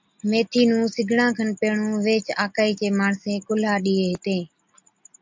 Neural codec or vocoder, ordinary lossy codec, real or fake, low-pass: none; MP3, 64 kbps; real; 7.2 kHz